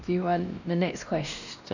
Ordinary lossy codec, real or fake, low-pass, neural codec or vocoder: none; fake; 7.2 kHz; codec, 16 kHz, 1 kbps, X-Codec, WavLM features, trained on Multilingual LibriSpeech